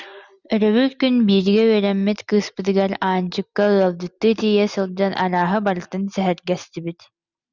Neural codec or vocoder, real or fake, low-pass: none; real; 7.2 kHz